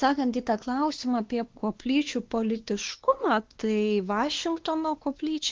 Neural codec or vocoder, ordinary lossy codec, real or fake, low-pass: codec, 16 kHz, 2 kbps, X-Codec, WavLM features, trained on Multilingual LibriSpeech; Opus, 16 kbps; fake; 7.2 kHz